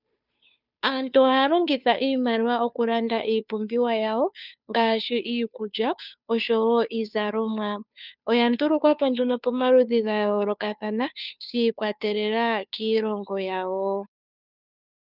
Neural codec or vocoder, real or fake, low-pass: codec, 16 kHz, 2 kbps, FunCodec, trained on Chinese and English, 25 frames a second; fake; 5.4 kHz